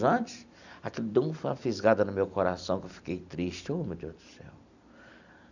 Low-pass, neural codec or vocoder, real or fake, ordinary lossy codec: 7.2 kHz; none; real; none